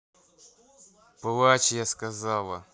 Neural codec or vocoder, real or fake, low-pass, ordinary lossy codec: none; real; none; none